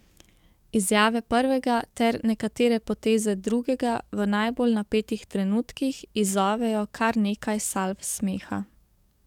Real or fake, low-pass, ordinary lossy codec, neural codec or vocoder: fake; 19.8 kHz; none; codec, 44.1 kHz, 7.8 kbps, DAC